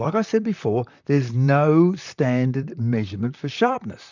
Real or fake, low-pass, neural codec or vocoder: fake; 7.2 kHz; vocoder, 44.1 kHz, 128 mel bands, Pupu-Vocoder